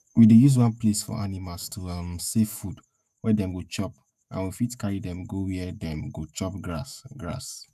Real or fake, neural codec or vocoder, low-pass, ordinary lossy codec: fake; autoencoder, 48 kHz, 128 numbers a frame, DAC-VAE, trained on Japanese speech; 14.4 kHz; none